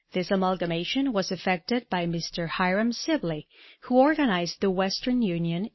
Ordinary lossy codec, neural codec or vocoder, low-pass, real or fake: MP3, 24 kbps; none; 7.2 kHz; real